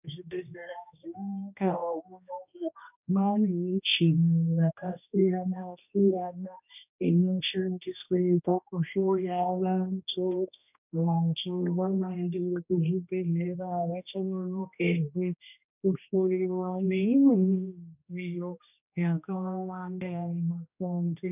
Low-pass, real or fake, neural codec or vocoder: 3.6 kHz; fake; codec, 16 kHz, 1 kbps, X-Codec, HuBERT features, trained on general audio